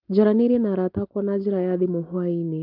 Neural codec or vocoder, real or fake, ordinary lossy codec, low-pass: none; real; Opus, 32 kbps; 5.4 kHz